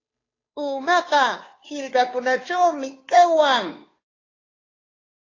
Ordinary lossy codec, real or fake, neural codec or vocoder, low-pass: AAC, 32 kbps; fake; codec, 16 kHz, 2 kbps, FunCodec, trained on Chinese and English, 25 frames a second; 7.2 kHz